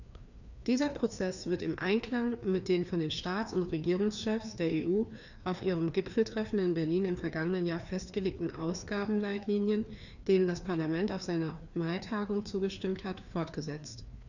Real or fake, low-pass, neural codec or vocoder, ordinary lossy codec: fake; 7.2 kHz; codec, 16 kHz, 2 kbps, FreqCodec, larger model; none